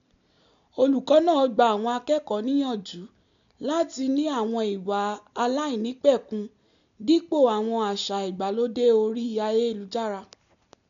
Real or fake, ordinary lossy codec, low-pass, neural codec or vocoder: real; MP3, 64 kbps; 7.2 kHz; none